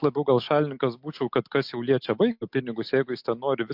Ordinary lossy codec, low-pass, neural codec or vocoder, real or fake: MP3, 48 kbps; 5.4 kHz; none; real